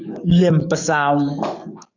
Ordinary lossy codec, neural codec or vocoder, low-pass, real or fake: AAC, 48 kbps; codec, 44.1 kHz, 7.8 kbps, DAC; 7.2 kHz; fake